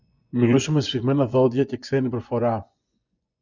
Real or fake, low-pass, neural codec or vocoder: fake; 7.2 kHz; vocoder, 24 kHz, 100 mel bands, Vocos